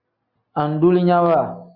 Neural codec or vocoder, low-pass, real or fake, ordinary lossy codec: none; 5.4 kHz; real; Opus, 64 kbps